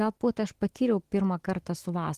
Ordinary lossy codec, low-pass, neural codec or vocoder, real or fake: Opus, 16 kbps; 14.4 kHz; autoencoder, 48 kHz, 128 numbers a frame, DAC-VAE, trained on Japanese speech; fake